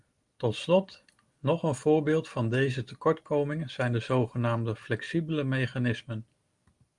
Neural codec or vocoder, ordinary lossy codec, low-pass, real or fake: none; Opus, 32 kbps; 10.8 kHz; real